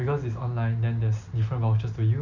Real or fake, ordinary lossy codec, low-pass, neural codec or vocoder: real; none; 7.2 kHz; none